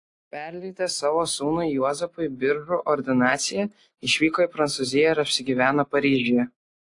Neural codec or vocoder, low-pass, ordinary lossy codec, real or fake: none; 10.8 kHz; AAC, 48 kbps; real